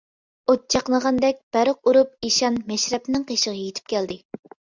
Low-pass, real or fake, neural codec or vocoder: 7.2 kHz; real; none